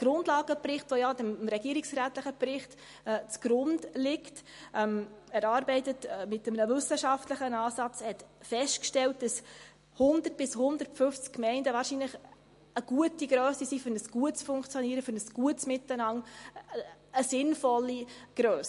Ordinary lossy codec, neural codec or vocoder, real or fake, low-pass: MP3, 48 kbps; none; real; 14.4 kHz